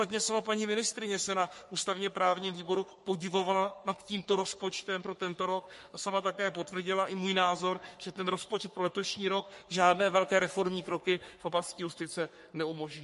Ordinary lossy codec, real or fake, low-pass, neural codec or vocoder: MP3, 48 kbps; fake; 14.4 kHz; codec, 44.1 kHz, 3.4 kbps, Pupu-Codec